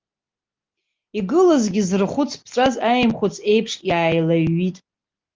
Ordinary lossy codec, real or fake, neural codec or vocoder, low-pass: Opus, 24 kbps; real; none; 7.2 kHz